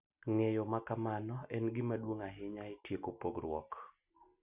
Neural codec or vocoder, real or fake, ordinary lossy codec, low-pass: none; real; Opus, 64 kbps; 3.6 kHz